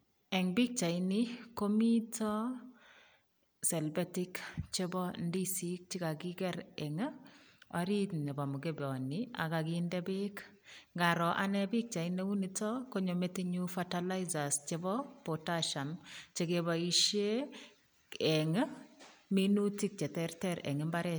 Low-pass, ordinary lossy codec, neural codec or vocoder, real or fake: none; none; none; real